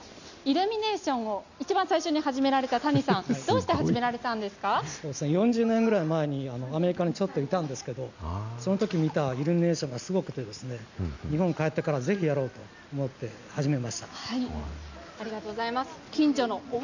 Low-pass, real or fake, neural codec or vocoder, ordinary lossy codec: 7.2 kHz; real; none; none